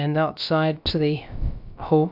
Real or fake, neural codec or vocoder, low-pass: fake; codec, 16 kHz, about 1 kbps, DyCAST, with the encoder's durations; 5.4 kHz